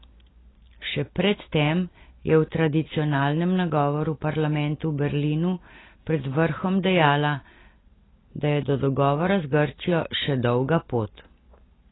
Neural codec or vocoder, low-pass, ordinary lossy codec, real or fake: none; 7.2 kHz; AAC, 16 kbps; real